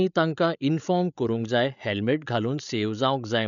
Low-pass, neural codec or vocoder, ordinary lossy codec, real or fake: 7.2 kHz; codec, 16 kHz, 8 kbps, FreqCodec, larger model; none; fake